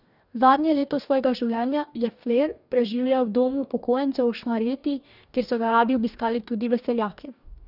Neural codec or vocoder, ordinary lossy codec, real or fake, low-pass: codec, 44.1 kHz, 2.6 kbps, DAC; none; fake; 5.4 kHz